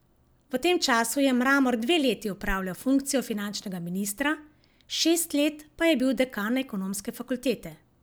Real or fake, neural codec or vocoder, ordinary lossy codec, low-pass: real; none; none; none